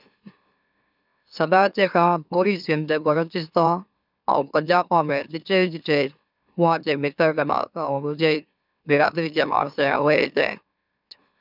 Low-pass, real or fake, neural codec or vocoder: 5.4 kHz; fake; autoencoder, 44.1 kHz, a latent of 192 numbers a frame, MeloTTS